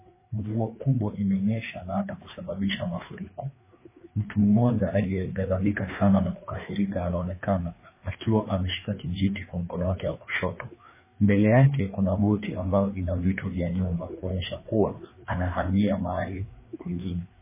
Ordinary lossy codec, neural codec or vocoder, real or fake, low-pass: MP3, 16 kbps; codec, 16 kHz, 2 kbps, FreqCodec, larger model; fake; 3.6 kHz